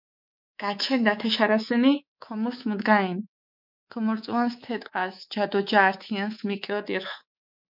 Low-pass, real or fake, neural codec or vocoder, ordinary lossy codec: 5.4 kHz; fake; codec, 24 kHz, 3.1 kbps, DualCodec; MP3, 48 kbps